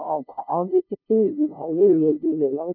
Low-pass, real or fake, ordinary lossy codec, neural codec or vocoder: 5.4 kHz; fake; none; codec, 16 kHz, 0.5 kbps, FunCodec, trained on LibriTTS, 25 frames a second